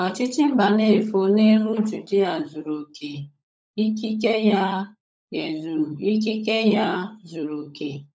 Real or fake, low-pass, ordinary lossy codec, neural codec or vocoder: fake; none; none; codec, 16 kHz, 16 kbps, FunCodec, trained on LibriTTS, 50 frames a second